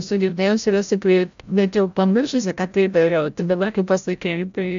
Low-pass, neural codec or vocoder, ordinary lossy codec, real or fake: 7.2 kHz; codec, 16 kHz, 0.5 kbps, FreqCodec, larger model; MP3, 64 kbps; fake